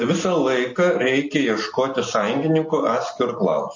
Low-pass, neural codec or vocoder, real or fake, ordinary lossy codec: 7.2 kHz; none; real; MP3, 32 kbps